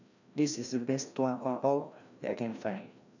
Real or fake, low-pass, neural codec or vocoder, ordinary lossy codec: fake; 7.2 kHz; codec, 16 kHz, 1 kbps, FreqCodec, larger model; none